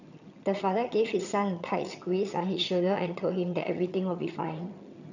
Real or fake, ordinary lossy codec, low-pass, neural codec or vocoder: fake; none; 7.2 kHz; vocoder, 22.05 kHz, 80 mel bands, HiFi-GAN